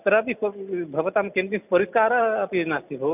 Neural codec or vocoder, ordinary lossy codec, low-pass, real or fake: none; none; 3.6 kHz; real